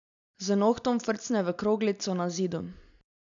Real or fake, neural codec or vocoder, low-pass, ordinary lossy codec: real; none; 7.2 kHz; none